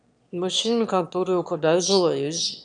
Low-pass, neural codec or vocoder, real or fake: 9.9 kHz; autoencoder, 22.05 kHz, a latent of 192 numbers a frame, VITS, trained on one speaker; fake